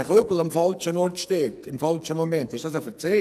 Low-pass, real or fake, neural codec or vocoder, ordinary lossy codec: 14.4 kHz; fake; codec, 44.1 kHz, 2.6 kbps, SNAC; none